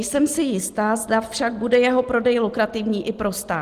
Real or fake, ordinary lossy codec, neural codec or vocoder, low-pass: real; Opus, 24 kbps; none; 14.4 kHz